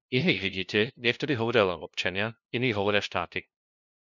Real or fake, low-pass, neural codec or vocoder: fake; 7.2 kHz; codec, 16 kHz, 0.5 kbps, FunCodec, trained on LibriTTS, 25 frames a second